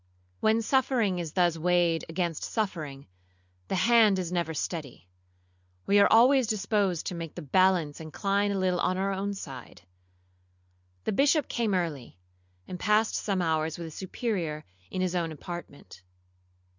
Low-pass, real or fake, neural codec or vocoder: 7.2 kHz; real; none